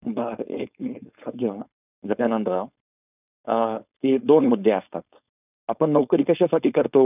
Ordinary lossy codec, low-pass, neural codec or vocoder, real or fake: none; 3.6 kHz; codec, 16 kHz, 4.8 kbps, FACodec; fake